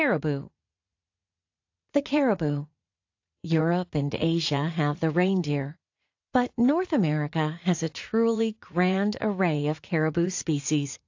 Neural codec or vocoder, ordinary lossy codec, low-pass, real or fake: vocoder, 44.1 kHz, 80 mel bands, Vocos; AAC, 48 kbps; 7.2 kHz; fake